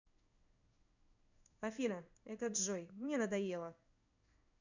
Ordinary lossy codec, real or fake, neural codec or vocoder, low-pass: none; fake; codec, 16 kHz in and 24 kHz out, 1 kbps, XY-Tokenizer; 7.2 kHz